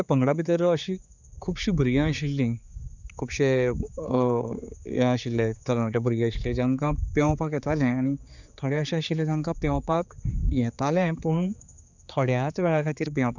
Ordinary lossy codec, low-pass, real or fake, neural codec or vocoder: none; 7.2 kHz; fake; codec, 16 kHz, 4 kbps, X-Codec, HuBERT features, trained on general audio